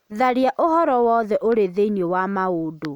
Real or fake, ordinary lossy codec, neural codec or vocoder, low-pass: real; MP3, 96 kbps; none; 19.8 kHz